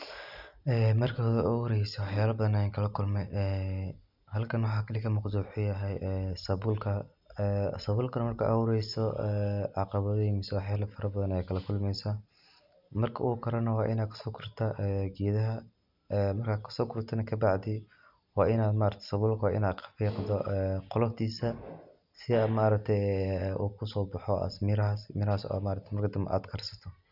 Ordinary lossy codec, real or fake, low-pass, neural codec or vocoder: none; real; 5.4 kHz; none